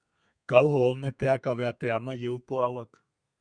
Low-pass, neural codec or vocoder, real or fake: 9.9 kHz; codec, 32 kHz, 1.9 kbps, SNAC; fake